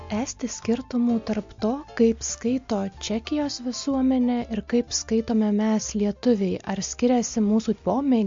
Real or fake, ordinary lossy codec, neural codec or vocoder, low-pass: real; MP3, 48 kbps; none; 7.2 kHz